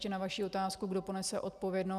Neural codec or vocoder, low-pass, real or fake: none; 14.4 kHz; real